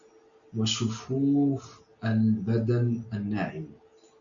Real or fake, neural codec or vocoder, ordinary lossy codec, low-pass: real; none; MP3, 64 kbps; 7.2 kHz